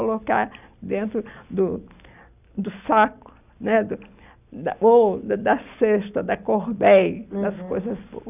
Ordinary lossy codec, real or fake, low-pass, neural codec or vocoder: none; real; 3.6 kHz; none